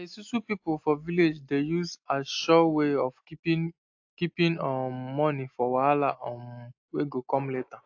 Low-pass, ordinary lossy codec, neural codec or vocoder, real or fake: 7.2 kHz; AAC, 48 kbps; none; real